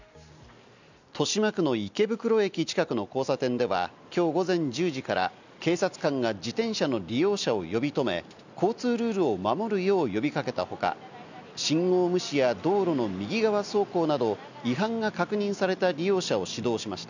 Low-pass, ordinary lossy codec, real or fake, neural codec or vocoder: 7.2 kHz; none; real; none